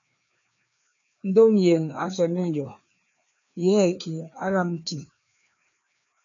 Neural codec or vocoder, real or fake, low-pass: codec, 16 kHz, 2 kbps, FreqCodec, larger model; fake; 7.2 kHz